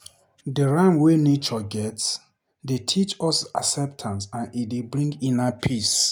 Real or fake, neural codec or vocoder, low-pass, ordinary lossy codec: real; none; 19.8 kHz; none